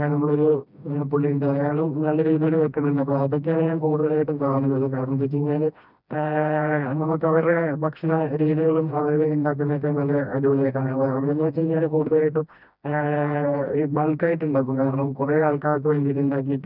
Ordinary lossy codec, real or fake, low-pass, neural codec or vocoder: none; fake; 5.4 kHz; codec, 16 kHz, 1 kbps, FreqCodec, smaller model